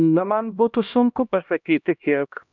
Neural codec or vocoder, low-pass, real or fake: codec, 16 kHz, 1 kbps, X-Codec, HuBERT features, trained on LibriSpeech; 7.2 kHz; fake